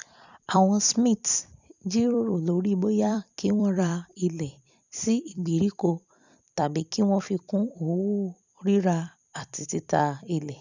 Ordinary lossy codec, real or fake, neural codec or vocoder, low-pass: none; fake; vocoder, 44.1 kHz, 128 mel bands every 256 samples, BigVGAN v2; 7.2 kHz